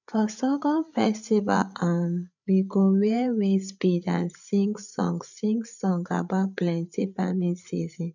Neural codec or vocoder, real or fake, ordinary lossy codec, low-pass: codec, 16 kHz, 8 kbps, FreqCodec, larger model; fake; none; 7.2 kHz